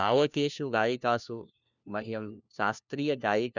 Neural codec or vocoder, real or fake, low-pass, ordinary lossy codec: codec, 16 kHz, 1 kbps, FunCodec, trained on LibriTTS, 50 frames a second; fake; 7.2 kHz; none